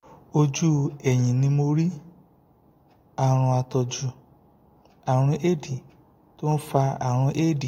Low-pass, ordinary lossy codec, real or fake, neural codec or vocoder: 19.8 kHz; AAC, 48 kbps; real; none